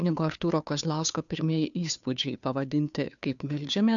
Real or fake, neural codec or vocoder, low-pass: fake; codec, 16 kHz, 2 kbps, FunCodec, trained on Chinese and English, 25 frames a second; 7.2 kHz